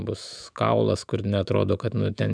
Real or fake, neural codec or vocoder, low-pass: fake; autoencoder, 48 kHz, 128 numbers a frame, DAC-VAE, trained on Japanese speech; 9.9 kHz